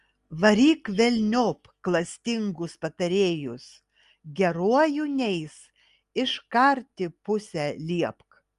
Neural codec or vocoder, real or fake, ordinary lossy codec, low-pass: none; real; Opus, 32 kbps; 10.8 kHz